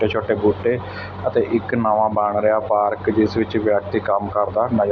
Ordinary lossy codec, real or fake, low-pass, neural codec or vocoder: none; real; none; none